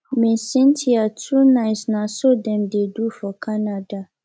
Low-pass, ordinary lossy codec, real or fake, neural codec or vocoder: none; none; real; none